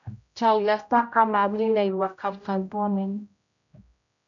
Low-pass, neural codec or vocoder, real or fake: 7.2 kHz; codec, 16 kHz, 0.5 kbps, X-Codec, HuBERT features, trained on general audio; fake